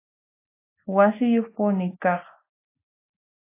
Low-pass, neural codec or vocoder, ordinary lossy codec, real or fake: 3.6 kHz; none; AAC, 24 kbps; real